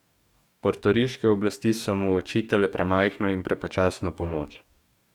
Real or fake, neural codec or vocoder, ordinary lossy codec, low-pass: fake; codec, 44.1 kHz, 2.6 kbps, DAC; none; 19.8 kHz